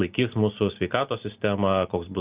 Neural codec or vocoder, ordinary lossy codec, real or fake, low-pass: none; Opus, 24 kbps; real; 3.6 kHz